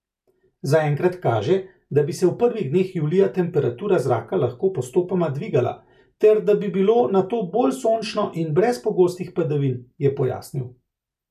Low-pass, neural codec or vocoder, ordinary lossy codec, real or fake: 14.4 kHz; none; none; real